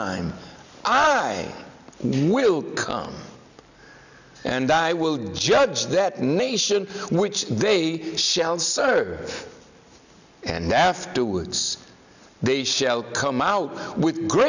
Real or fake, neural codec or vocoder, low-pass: real; none; 7.2 kHz